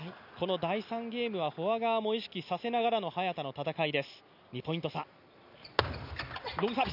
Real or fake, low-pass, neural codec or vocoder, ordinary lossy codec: real; 5.4 kHz; none; none